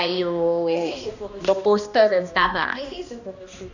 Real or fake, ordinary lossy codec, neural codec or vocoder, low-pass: fake; none; codec, 16 kHz, 1 kbps, X-Codec, HuBERT features, trained on balanced general audio; 7.2 kHz